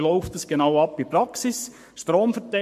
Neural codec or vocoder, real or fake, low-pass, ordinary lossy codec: codec, 44.1 kHz, 7.8 kbps, Pupu-Codec; fake; 14.4 kHz; MP3, 64 kbps